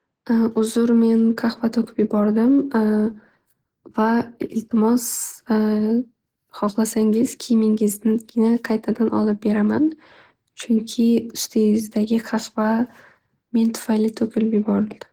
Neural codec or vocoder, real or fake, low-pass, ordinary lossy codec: none; real; 19.8 kHz; Opus, 16 kbps